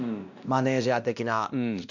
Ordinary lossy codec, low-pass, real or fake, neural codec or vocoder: none; 7.2 kHz; fake; codec, 16 kHz, 1 kbps, X-Codec, WavLM features, trained on Multilingual LibriSpeech